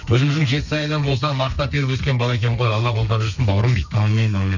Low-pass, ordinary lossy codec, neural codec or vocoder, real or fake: 7.2 kHz; none; codec, 32 kHz, 1.9 kbps, SNAC; fake